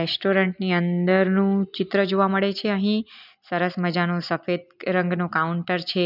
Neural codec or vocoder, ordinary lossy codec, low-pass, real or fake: none; none; 5.4 kHz; real